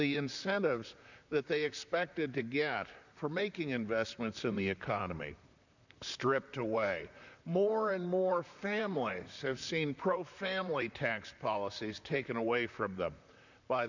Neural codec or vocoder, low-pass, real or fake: vocoder, 44.1 kHz, 128 mel bands, Pupu-Vocoder; 7.2 kHz; fake